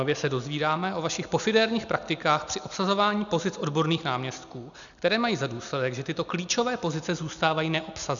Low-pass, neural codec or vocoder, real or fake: 7.2 kHz; none; real